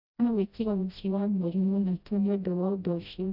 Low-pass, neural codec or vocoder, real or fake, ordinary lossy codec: 5.4 kHz; codec, 16 kHz, 0.5 kbps, FreqCodec, smaller model; fake; none